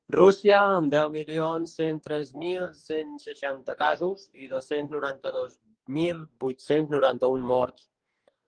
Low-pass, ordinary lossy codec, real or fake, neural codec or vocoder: 9.9 kHz; Opus, 24 kbps; fake; codec, 44.1 kHz, 2.6 kbps, DAC